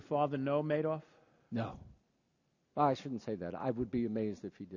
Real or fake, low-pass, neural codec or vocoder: real; 7.2 kHz; none